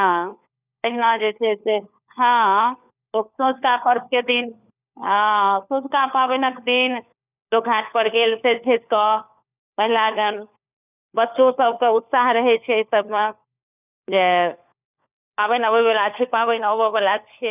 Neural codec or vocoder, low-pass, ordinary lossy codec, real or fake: codec, 16 kHz, 4 kbps, FunCodec, trained on LibriTTS, 50 frames a second; 3.6 kHz; none; fake